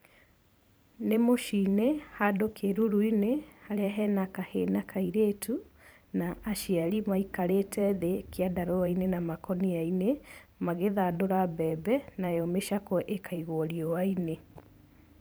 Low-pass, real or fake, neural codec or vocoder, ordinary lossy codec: none; fake; vocoder, 44.1 kHz, 128 mel bands every 512 samples, BigVGAN v2; none